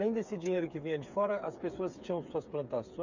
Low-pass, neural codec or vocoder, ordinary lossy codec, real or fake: 7.2 kHz; codec, 16 kHz, 8 kbps, FreqCodec, smaller model; Opus, 64 kbps; fake